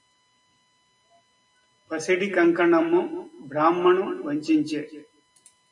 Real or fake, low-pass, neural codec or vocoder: real; 10.8 kHz; none